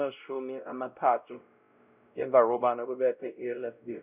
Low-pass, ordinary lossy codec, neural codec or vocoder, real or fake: 3.6 kHz; none; codec, 16 kHz, 0.5 kbps, X-Codec, WavLM features, trained on Multilingual LibriSpeech; fake